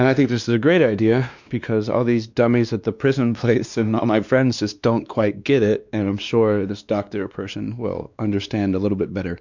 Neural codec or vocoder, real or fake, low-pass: codec, 16 kHz, 2 kbps, X-Codec, WavLM features, trained on Multilingual LibriSpeech; fake; 7.2 kHz